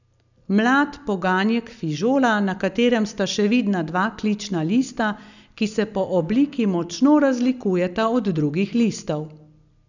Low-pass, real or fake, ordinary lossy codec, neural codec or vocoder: 7.2 kHz; real; none; none